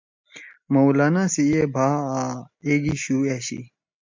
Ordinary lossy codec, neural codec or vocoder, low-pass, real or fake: MP3, 64 kbps; none; 7.2 kHz; real